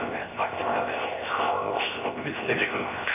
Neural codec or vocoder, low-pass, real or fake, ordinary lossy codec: codec, 16 kHz, 0.7 kbps, FocalCodec; 3.6 kHz; fake; MP3, 32 kbps